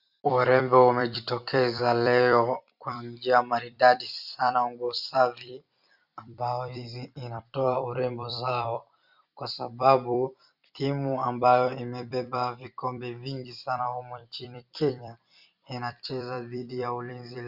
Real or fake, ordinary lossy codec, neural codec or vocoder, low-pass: fake; Opus, 64 kbps; vocoder, 44.1 kHz, 128 mel bands every 256 samples, BigVGAN v2; 5.4 kHz